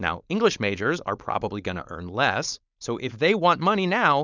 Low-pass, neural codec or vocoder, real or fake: 7.2 kHz; codec, 16 kHz, 4.8 kbps, FACodec; fake